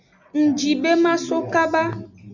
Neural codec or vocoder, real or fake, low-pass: none; real; 7.2 kHz